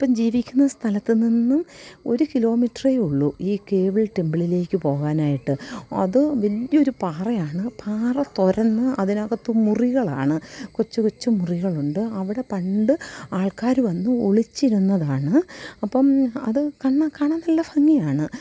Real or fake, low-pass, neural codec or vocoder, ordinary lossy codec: real; none; none; none